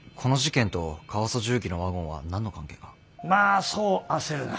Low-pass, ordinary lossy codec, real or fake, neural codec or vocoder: none; none; real; none